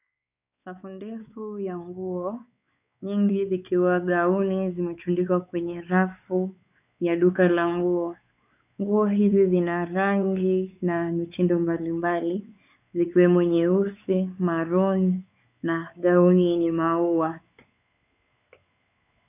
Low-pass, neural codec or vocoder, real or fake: 3.6 kHz; codec, 16 kHz, 4 kbps, X-Codec, WavLM features, trained on Multilingual LibriSpeech; fake